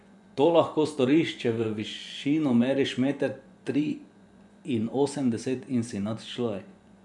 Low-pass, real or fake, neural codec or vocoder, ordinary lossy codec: 10.8 kHz; fake; vocoder, 24 kHz, 100 mel bands, Vocos; none